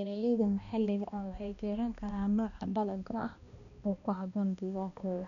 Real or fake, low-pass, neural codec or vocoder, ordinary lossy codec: fake; 7.2 kHz; codec, 16 kHz, 1 kbps, X-Codec, HuBERT features, trained on balanced general audio; none